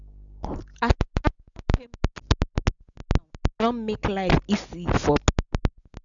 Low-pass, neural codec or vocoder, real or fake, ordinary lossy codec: 7.2 kHz; none; real; none